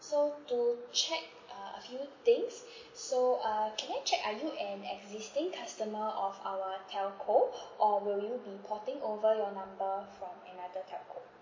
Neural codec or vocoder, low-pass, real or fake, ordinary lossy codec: none; 7.2 kHz; real; MP3, 32 kbps